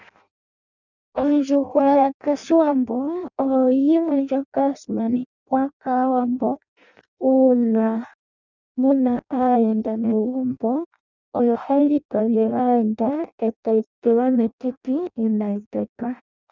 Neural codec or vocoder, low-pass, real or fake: codec, 16 kHz in and 24 kHz out, 0.6 kbps, FireRedTTS-2 codec; 7.2 kHz; fake